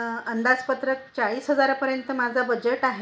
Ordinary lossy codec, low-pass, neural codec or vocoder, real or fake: none; none; none; real